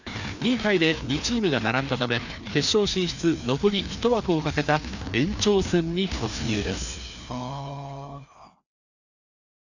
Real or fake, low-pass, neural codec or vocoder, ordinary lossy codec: fake; 7.2 kHz; codec, 16 kHz, 2 kbps, FreqCodec, larger model; none